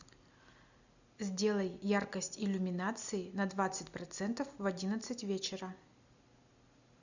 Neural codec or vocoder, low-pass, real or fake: none; 7.2 kHz; real